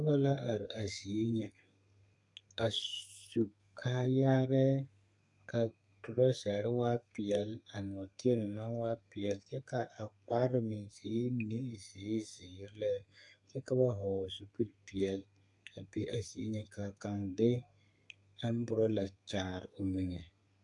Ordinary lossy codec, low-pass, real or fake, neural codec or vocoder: AAC, 64 kbps; 10.8 kHz; fake; codec, 32 kHz, 1.9 kbps, SNAC